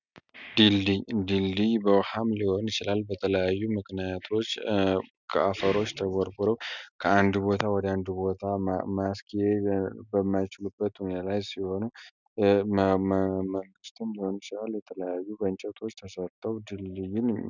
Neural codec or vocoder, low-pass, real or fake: none; 7.2 kHz; real